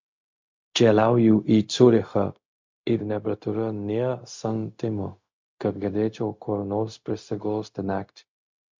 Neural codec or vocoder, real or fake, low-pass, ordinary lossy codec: codec, 16 kHz, 0.4 kbps, LongCat-Audio-Codec; fake; 7.2 kHz; MP3, 48 kbps